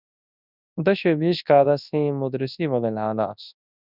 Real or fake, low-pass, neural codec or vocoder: fake; 5.4 kHz; codec, 24 kHz, 0.9 kbps, WavTokenizer, large speech release